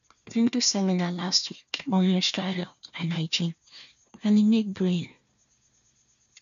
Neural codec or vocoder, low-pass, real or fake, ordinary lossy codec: codec, 16 kHz, 1 kbps, FunCodec, trained on Chinese and English, 50 frames a second; 7.2 kHz; fake; none